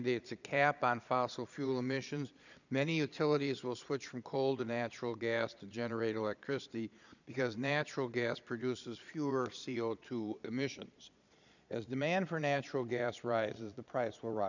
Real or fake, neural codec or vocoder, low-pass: fake; vocoder, 22.05 kHz, 80 mel bands, Vocos; 7.2 kHz